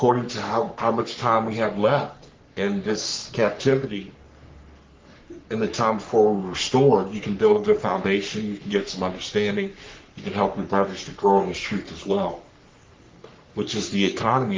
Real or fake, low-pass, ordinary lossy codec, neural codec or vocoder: fake; 7.2 kHz; Opus, 32 kbps; codec, 44.1 kHz, 3.4 kbps, Pupu-Codec